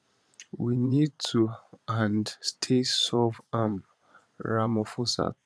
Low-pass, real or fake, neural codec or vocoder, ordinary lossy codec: none; fake; vocoder, 22.05 kHz, 80 mel bands, Vocos; none